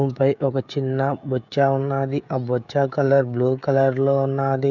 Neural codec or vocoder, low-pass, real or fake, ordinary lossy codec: codec, 16 kHz, 16 kbps, FreqCodec, smaller model; 7.2 kHz; fake; none